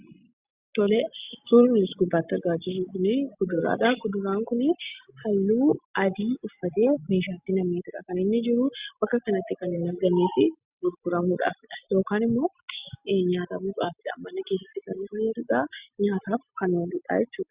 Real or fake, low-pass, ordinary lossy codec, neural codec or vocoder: real; 3.6 kHz; Opus, 64 kbps; none